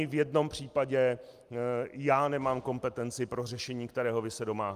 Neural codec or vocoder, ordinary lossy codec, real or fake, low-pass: none; Opus, 32 kbps; real; 14.4 kHz